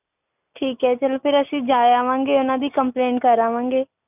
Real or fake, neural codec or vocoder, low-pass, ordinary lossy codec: real; none; 3.6 kHz; none